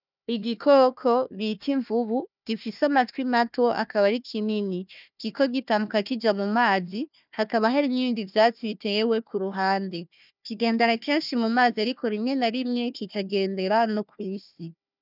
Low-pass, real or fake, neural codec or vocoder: 5.4 kHz; fake; codec, 16 kHz, 1 kbps, FunCodec, trained on Chinese and English, 50 frames a second